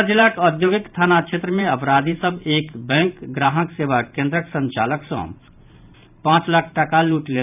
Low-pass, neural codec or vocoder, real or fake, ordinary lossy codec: 3.6 kHz; vocoder, 44.1 kHz, 128 mel bands every 512 samples, BigVGAN v2; fake; none